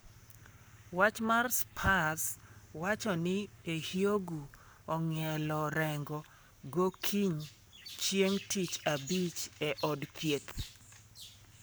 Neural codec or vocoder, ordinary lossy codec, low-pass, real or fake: codec, 44.1 kHz, 7.8 kbps, Pupu-Codec; none; none; fake